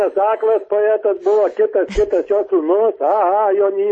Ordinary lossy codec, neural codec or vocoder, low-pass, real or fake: MP3, 32 kbps; none; 9.9 kHz; real